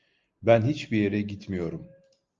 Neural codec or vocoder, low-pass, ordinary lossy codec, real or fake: none; 7.2 kHz; Opus, 24 kbps; real